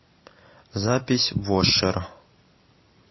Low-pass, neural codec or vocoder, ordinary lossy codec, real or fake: 7.2 kHz; none; MP3, 24 kbps; real